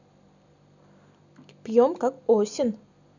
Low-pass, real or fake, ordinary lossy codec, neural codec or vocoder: 7.2 kHz; real; none; none